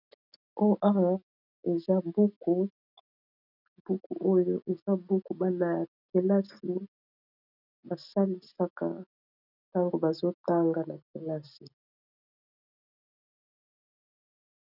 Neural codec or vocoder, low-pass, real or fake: none; 5.4 kHz; real